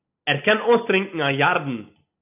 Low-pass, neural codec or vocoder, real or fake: 3.6 kHz; none; real